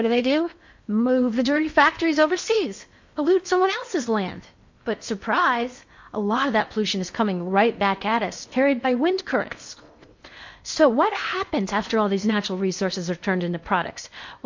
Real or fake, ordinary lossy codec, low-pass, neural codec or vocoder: fake; MP3, 48 kbps; 7.2 kHz; codec, 16 kHz in and 24 kHz out, 0.8 kbps, FocalCodec, streaming, 65536 codes